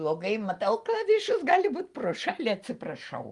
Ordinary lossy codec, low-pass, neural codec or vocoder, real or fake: Opus, 32 kbps; 10.8 kHz; none; real